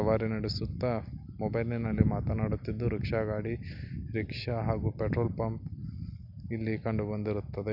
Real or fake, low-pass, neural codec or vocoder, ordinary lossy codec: real; 5.4 kHz; none; none